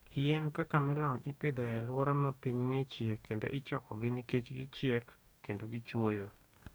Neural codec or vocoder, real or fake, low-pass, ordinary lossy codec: codec, 44.1 kHz, 2.6 kbps, DAC; fake; none; none